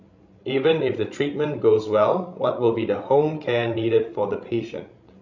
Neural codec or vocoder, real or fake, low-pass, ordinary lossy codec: codec, 16 kHz, 16 kbps, FreqCodec, larger model; fake; 7.2 kHz; MP3, 48 kbps